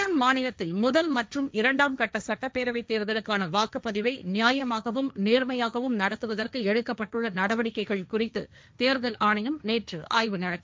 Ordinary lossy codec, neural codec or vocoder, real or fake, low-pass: none; codec, 16 kHz, 1.1 kbps, Voila-Tokenizer; fake; none